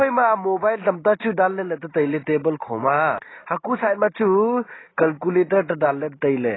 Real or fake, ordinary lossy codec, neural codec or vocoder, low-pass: real; AAC, 16 kbps; none; 7.2 kHz